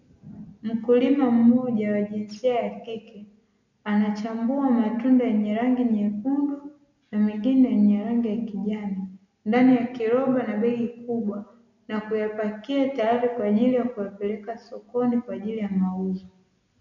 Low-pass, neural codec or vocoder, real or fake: 7.2 kHz; none; real